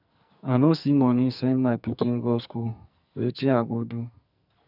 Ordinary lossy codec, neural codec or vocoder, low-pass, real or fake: none; codec, 44.1 kHz, 2.6 kbps, SNAC; 5.4 kHz; fake